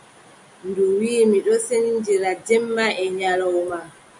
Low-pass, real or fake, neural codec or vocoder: 10.8 kHz; real; none